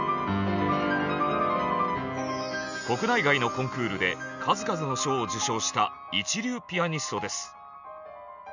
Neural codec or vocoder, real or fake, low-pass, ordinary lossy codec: none; real; 7.2 kHz; none